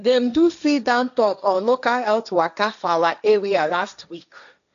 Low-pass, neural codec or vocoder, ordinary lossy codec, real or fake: 7.2 kHz; codec, 16 kHz, 1.1 kbps, Voila-Tokenizer; none; fake